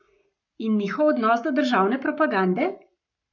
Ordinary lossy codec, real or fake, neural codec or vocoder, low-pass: none; fake; codec, 44.1 kHz, 7.8 kbps, Pupu-Codec; 7.2 kHz